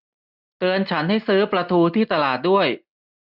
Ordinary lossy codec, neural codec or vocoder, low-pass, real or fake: none; none; 5.4 kHz; real